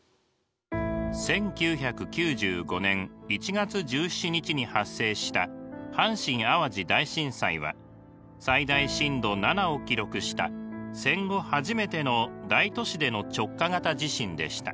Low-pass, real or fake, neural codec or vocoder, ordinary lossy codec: none; real; none; none